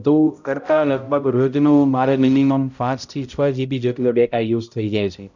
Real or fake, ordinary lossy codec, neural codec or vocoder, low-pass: fake; none; codec, 16 kHz, 0.5 kbps, X-Codec, HuBERT features, trained on balanced general audio; 7.2 kHz